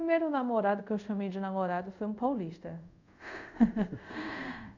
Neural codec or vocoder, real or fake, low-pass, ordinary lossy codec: codec, 24 kHz, 0.5 kbps, DualCodec; fake; 7.2 kHz; none